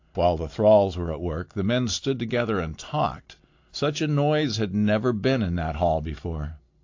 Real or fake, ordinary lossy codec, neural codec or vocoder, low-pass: real; AAC, 48 kbps; none; 7.2 kHz